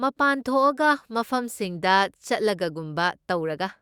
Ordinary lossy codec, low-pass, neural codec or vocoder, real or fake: Opus, 64 kbps; 19.8 kHz; autoencoder, 48 kHz, 128 numbers a frame, DAC-VAE, trained on Japanese speech; fake